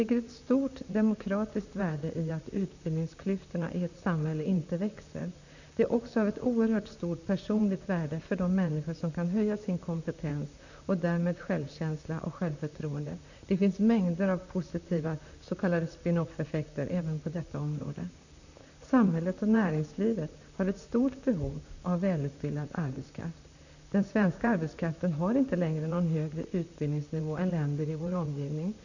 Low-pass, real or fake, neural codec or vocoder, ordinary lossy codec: 7.2 kHz; fake; vocoder, 44.1 kHz, 128 mel bands, Pupu-Vocoder; none